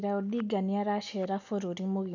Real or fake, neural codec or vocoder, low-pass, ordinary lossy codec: real; none; 7.2 kHz; none